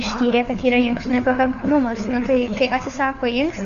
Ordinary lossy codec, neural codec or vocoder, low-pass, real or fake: AAC, 96 kbps; codec, 16 kHz, 4 kbps, FunCodec, trained on LibriTTS, 50 frames a second; 7.2 kHz; fake